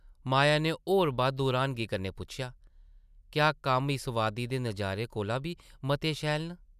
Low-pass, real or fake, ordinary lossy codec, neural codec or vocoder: 14.4 kHz; real; none; none